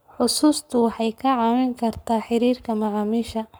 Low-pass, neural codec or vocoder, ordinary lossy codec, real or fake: none; codec, 44.1 kHz, 7.8 kbps, Pupu-Codec; none; fake